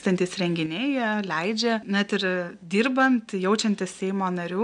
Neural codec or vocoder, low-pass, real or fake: none; 9.9 kHz; real